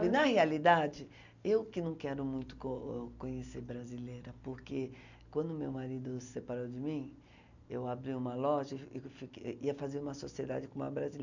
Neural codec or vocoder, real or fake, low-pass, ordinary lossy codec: none; real; 7.2 kHz; none